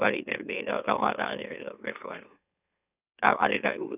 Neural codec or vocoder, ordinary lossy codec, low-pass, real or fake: autoencoder, 44.1 kHz, a latent of 192 numbers a frame, MeloTTS; none; 3.6 kHz; fake